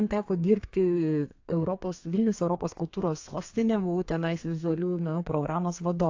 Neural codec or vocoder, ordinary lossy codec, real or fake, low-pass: codec, 32 kHz, 1.9 kbps, SNAC; AAC, 48 kbps; fake; 7.2 kHz